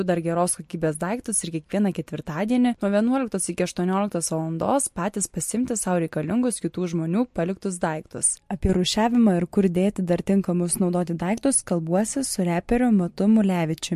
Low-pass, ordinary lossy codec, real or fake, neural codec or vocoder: 14.4 kHz; MP3, 64 kbps; real; none